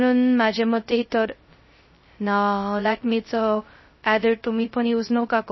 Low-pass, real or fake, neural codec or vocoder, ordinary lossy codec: 7.2 kHz; fake; codec, 16 kHz, 0.2 kbps, FocalCodec; MP3, 24 kbps